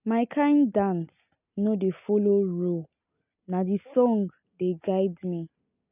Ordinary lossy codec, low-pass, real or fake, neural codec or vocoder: none; 3.6 kHz; real; none